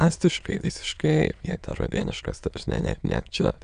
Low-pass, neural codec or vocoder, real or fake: 9.9 kHz; autoencoder, 22.05 kHz, a latent of 192 numbers a frame, VITS, trained on many speakers; fake